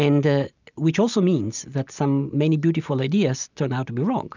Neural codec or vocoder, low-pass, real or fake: none; 7.2 kHz; real